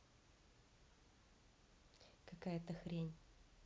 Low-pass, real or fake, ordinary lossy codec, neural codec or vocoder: none; real; none; none